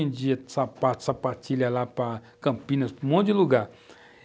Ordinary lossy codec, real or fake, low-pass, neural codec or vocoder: none; real; none; none